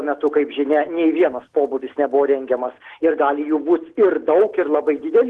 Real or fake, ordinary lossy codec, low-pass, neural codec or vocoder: real; Opus, 16 kbps; 10.8 kHz; none